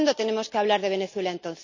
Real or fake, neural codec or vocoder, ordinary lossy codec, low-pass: real; none; none; 7.2 kHz